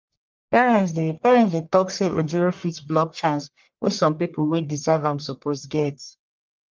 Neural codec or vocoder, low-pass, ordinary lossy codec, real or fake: codec, 44.1 kHz, 1.7 kbps, Pupu-Codec; 7.2 kHz; Opus, 32 kbps; fake